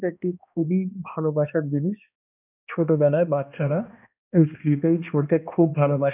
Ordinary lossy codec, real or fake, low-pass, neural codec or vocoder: none; fake; 3.6 kHz; codec, 16 kHz, 1 kbps, X-Codec, HuBERT features, trained on balanced general audio